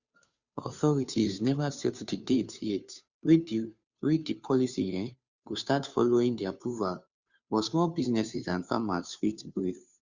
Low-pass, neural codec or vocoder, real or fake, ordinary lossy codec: 7.2 kHz; codec, 16 kHz, 2 kbps, FunCodec, trained on Chinese and English, 25 frames a second; fake; Opus, 64 kbps